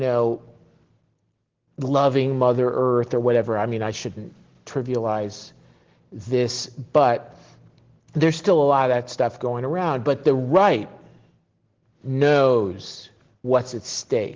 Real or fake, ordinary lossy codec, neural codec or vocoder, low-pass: real; Opus, 16 kbps; none; 7.2 kHz